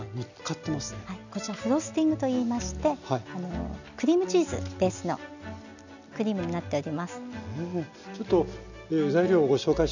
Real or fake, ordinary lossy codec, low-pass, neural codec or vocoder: real; none; 7.2 kHz; none